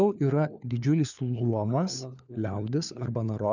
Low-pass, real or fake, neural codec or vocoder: 7.2 kHz; fake; codec, 16 kHz, 4 kbps, FunCodec, trained on LibriTTS, 50 frames a second